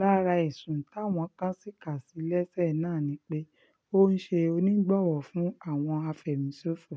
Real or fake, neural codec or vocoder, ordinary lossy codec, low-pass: real; none; none; none